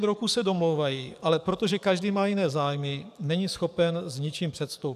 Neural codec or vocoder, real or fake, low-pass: codec, 44.1 kHz, 7.8 kbps, DAC; fake; 14.4 kHz